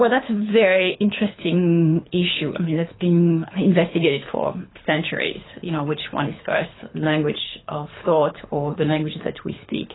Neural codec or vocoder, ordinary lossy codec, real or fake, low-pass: codec, 24 kHz, 6 kbps, HILCodec; AAC, 16 kbps; fake; 7.2 kHz